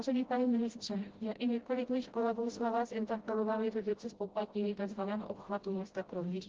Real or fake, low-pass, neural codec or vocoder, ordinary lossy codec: fake; 7.2 kHz; codec, 16 kHz, 0.5 kbps, FreqCodec, smaller model; Opus, 16 kbps